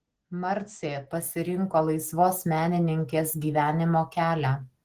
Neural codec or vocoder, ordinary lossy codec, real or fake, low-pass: none; Opus, 16 kbps; real; 14.4 kHz